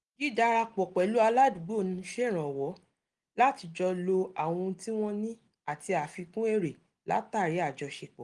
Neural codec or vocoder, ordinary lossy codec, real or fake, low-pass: none; Opus, 24 kbps; real; 10.8 kHz